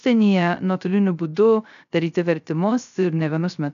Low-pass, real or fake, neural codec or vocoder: 7.2 kHz; fake; codec, 16 kHz, 0.3 kbps, FocalCodec